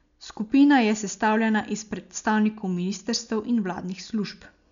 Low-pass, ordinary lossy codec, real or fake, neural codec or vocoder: 7.2 kHz; none; real; none